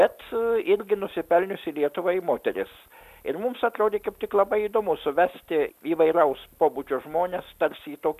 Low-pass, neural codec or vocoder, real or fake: 14.4 kHz; none; real